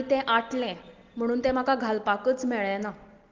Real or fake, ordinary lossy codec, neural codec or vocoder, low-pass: real; Opus, 16 kbps; none; 7.2 kHz